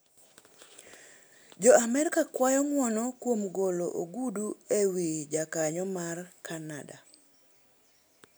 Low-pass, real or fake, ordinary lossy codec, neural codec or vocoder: none; real; none; none